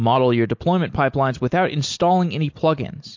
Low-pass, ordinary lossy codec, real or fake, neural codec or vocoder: 7.2 kHz; MP3, 48 kbps; real; none